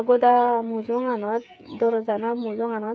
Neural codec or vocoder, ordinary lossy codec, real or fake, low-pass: codec, 16 kHz, 8 kbps, FreqCodec, smaller model; none; fake; none